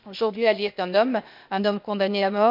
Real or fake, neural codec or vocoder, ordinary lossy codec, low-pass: fake; codec, 16 kHz, 0.8 kbps, ZipCodec; MP3, 48 kbps; 5.4 kHz